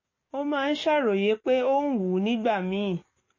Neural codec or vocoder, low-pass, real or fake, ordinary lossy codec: codec, 44.1 kHz, 7.8 kbps, DAC; 7.2 kHz; fake; MP3, 32 kbps